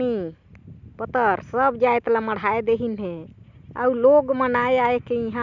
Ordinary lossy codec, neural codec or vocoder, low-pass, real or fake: none; none; 7.2 kHz; real